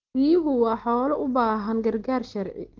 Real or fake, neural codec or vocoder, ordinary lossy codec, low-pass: real; none; Opus, 16 kbps; 7.2 kHz